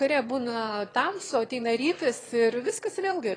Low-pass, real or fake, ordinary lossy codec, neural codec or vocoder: 9.9 kHz; fake; AAC, 32 kbps; autoencoder, 22.05 kHz, a latent of 192 numbers a frame, VITS, trained on one speaker